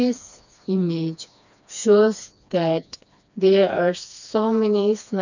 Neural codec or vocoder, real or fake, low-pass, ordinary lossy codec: codec, 16 kHz, 2 kbps, FreqCodec, smaller model; fake; 7.2 kHz; none